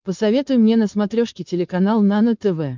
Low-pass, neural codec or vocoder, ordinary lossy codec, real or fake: 7.2 kHz; codec, 16 kHz, 4.8 kbps, FACodec; MP3, 64 kbps; fake